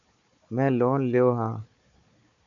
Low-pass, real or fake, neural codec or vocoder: 7.2 kHz; fake; codec, 16 kHz, 4 kbps, FunCodec, trained on Chinese and English, 50 frames a second